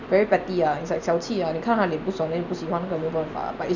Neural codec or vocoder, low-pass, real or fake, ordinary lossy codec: none; 7.2 kHz; real; none